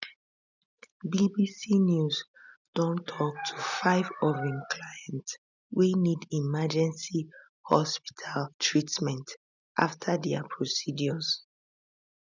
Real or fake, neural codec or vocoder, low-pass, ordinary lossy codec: real; none; 7.2 kHz; none